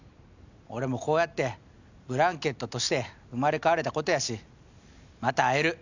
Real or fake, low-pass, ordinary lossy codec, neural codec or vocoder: real; 7.2 kHz; none; none